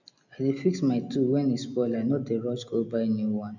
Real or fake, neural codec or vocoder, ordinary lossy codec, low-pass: real; none; none; 7.2 kHz